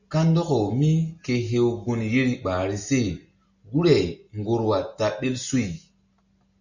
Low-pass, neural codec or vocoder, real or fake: 7.2 kHz; none; real